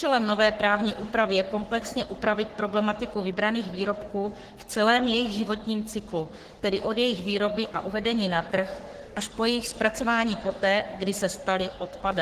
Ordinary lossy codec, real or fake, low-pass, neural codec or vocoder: Opus, 16 kbps; fake; 14.4 kHz; codec, 44.1 kHz, 3.4 kbps, Pupu-Codec